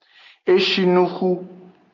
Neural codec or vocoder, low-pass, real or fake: none; 7.2 kHz; real